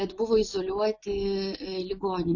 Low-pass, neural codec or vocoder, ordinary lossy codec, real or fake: 7.2 kHz; none; AAC, 48 kbps; real